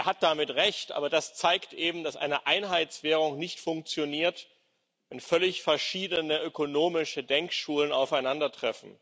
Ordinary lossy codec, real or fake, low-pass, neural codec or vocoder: none; real; none; none